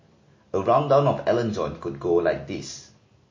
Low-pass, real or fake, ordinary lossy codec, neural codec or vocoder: 7.2 kHz; real; MP3, 32 kbps; none